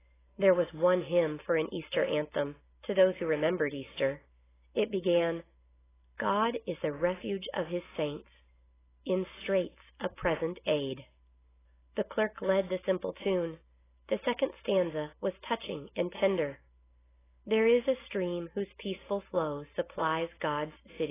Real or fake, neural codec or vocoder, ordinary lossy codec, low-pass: real; none; AAC, 16 kbps; 3.6 kHz